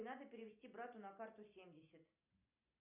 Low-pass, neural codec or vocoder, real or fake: 3.6 kHz; none; real